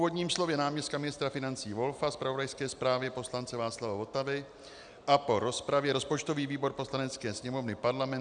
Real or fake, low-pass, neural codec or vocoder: real; 9.9 kHz; none